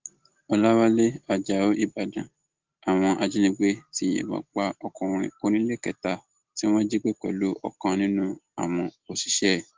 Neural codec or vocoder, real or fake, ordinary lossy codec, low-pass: none; real; Opus, 16 kbps; 7.2 kHz